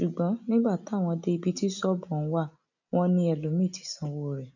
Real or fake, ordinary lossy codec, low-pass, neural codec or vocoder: real; none; 7.2 kHz; none